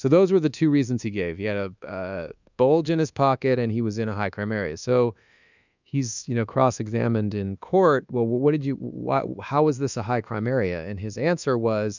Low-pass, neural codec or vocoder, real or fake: 7.2 kHz; codec, 24 kHz, 1.2 kbps, DualCodec; fake